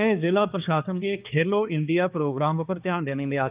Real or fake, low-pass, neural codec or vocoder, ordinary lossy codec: fake; 3.6 kHz; codec, 16 kHz, 2 kbps, X-Codec, HuBERT features, trained on balanced general audio; Opus, 24 kbps